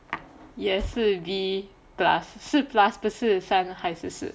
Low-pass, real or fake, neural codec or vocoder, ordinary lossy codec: none; real; none; none